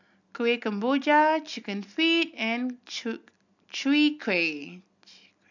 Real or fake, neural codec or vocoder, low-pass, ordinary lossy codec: real; none; 7.2 kHz; none